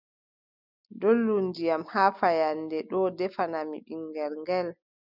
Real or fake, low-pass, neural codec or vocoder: real; 5.4 kHz; none